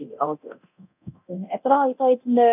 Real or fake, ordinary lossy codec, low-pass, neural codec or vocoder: fake; none; 3.6 kHz; codec, 24 kHz, 0.9 kbps, DualCodec